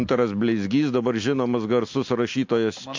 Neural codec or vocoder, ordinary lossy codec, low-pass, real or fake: none; MP3, 48 kbps; 7.2 kHz; real